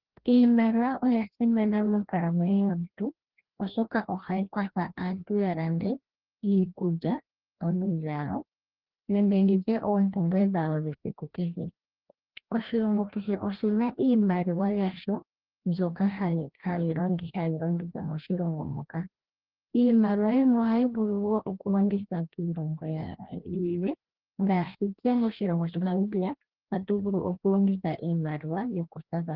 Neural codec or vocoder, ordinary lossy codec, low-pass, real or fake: codec, 16 kHz, 1 kbps, FreqCodec, larger model; Opus, 16 kbps; 5.4 kHz; fake